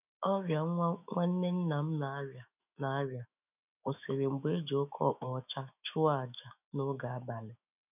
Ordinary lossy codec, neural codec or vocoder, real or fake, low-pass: none; autoencoder, 48 kHz, 128 numbers a frame, DAC-VAE, trained on Japanese speech; fake; 3.6 kHz